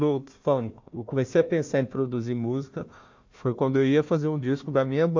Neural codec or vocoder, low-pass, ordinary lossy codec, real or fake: codec, 16 kHz, 1 kbps, FunCodec, trained on Chinese and English, 50 frames a second; 7.2 kHz; MP3, 48 kbps; fake